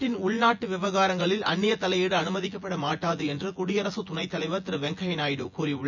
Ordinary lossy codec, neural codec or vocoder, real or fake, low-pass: none; vocoder, 24 kHz, 100 mel bands, Vocos; fake; 7.2 kHz